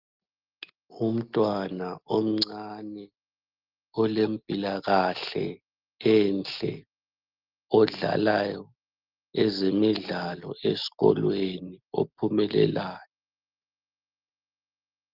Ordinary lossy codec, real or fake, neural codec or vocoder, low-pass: Opus, 32 kbps; real; none; 5.4 kHz